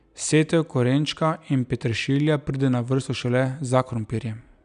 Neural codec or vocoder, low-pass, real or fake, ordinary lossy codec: none; 9.9 kHz; real; none